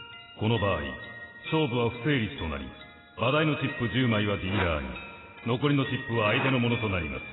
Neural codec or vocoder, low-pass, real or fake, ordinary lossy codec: none; 7.2 kHz; real; AAC, 16 kbps